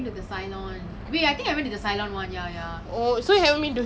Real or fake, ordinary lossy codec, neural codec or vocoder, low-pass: real; none; none; none